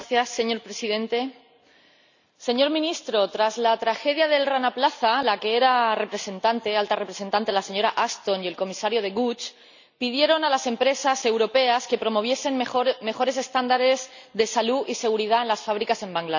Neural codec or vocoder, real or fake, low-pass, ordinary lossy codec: none; real; 7.2 kHz; none